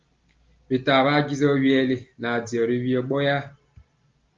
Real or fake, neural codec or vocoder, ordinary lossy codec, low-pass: real; none; Opus, 32 kbps; 7.2 kHz